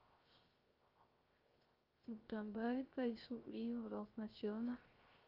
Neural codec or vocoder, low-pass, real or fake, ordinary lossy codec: codec, 16 kHz, 0.3 kbps, FocalCodec; 5.4 kHz; fake; Opus, 24 kbps